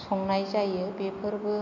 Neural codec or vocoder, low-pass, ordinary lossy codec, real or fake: none; 7.2 kHz; MP3, 48 kbps; real